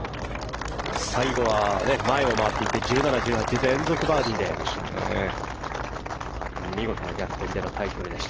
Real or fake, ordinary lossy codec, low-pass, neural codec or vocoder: real; Opus, 16 kbps; 7.2 kHz; none